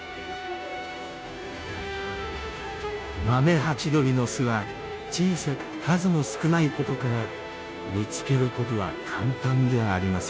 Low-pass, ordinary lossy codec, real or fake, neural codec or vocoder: none; none; fake; codec, 16 kHz, 0.5 kbps, FunCodec, trained on Chinese and English, 25 frames a second